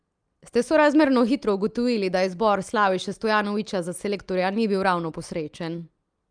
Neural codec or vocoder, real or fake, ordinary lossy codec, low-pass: none; real; Opus, 32 kbps; 9.9 kHz